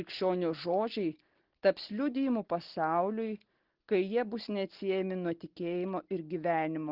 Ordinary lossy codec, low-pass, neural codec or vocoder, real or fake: Opus, 16 kbps; 5.4 kHz; none; real